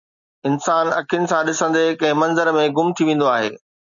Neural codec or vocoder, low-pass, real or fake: none; 7.2 kHz; real